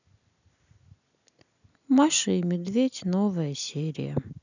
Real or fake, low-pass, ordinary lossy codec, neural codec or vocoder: real; 7.2 kHz; none; none